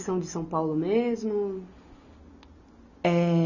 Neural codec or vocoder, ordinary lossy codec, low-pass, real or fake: none; none; 7.2 kHz; real